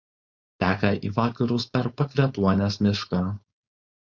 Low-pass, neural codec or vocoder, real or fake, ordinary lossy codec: 7.2 kHz; codec, 16 kHz, 4.8 kbps, FACodec; fake; Opus, 64 kbps